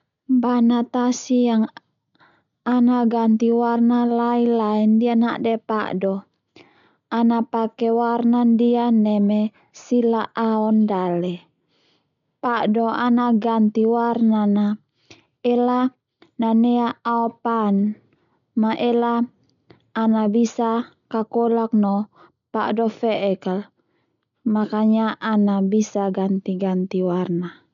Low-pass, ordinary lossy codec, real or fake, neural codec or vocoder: 7.2 kHz; none; real; none